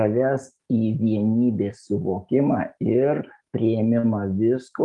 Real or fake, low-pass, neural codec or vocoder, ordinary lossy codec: real; 10.8 kHz; none; Opus, 32 kbps